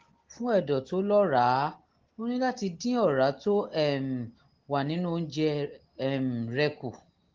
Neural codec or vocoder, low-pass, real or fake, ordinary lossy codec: none; 7.2 kHz; real; Opus, 16 kbps